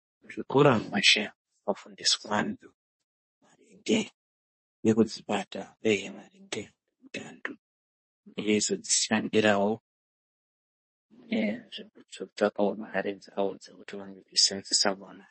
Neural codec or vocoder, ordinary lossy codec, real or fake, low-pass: codec, 24 kHz, 1 kbps, SNAC; MP3, 32 kbps; fake; 10.8 kHz